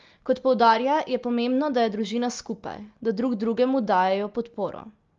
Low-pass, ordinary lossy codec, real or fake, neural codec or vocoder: 7.2 kHz; Opus, 32 kbps; real; none